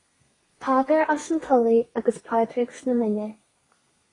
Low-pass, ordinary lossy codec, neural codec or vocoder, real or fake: 10.8 kHz; AAC, 32 kbps; codec, 32 kHz, 1.9 kbps, SNAC; fake